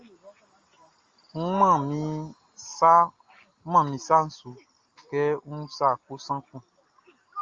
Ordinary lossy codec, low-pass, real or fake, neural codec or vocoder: Opus, 32 kbps; 7.2 kHz; real; none